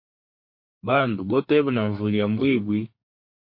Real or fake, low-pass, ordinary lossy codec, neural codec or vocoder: fake; 5.4 kHz; MP3, 32 kbps; codec, 32 kHz, 1.9 kbps, SNAC